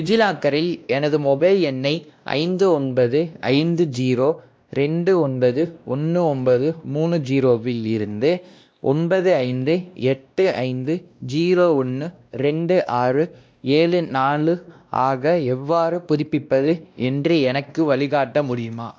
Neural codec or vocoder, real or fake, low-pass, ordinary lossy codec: codec, 16 kHz, 1 kbps, X-Codec, WavLM features, trained on Multilingual LibriSpeech; fake; none; none